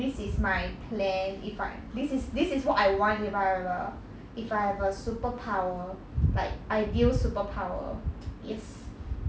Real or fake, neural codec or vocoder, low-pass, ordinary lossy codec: real; none; none; none